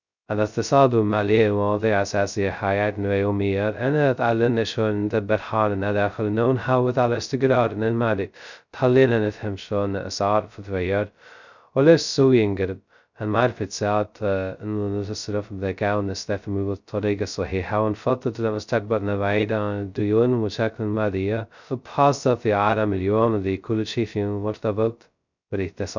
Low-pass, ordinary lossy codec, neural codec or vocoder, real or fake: 7.2 kHz; none; codec, 16 kHz, 0.2 kbps, FocalCodec; fake